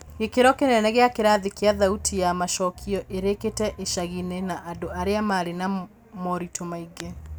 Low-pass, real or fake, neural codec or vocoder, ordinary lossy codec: none; real; none; none